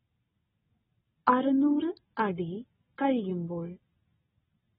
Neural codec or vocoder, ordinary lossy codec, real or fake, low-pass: none; AAC, 16 kbps; real; 14.4 kHz